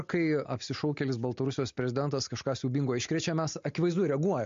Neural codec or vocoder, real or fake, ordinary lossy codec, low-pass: none; real; MP3, 48 kbps; 7.2 kHz